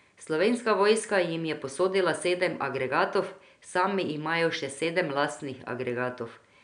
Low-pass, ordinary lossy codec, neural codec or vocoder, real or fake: 9.9 kHz; none; none; real